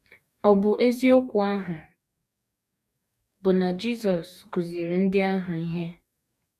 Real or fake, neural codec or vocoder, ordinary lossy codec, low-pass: fake; codec, 44.1 kHz, 2.6 kbps, DAC; none; 14.4 kHz